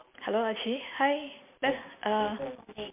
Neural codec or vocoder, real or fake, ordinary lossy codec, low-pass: none; real; MP3, 32 kbps; 3.6 kHz